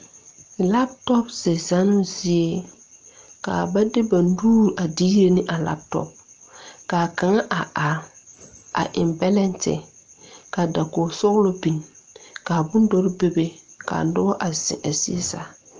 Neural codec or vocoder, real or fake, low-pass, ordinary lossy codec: none; real; 7.2 kHz; Opus, 24 kbps